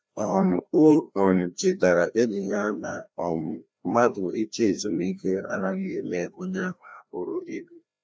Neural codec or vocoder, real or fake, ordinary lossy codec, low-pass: codec, 16 kHz, 1 kbps, FreqCodec, larger model; fake; none; none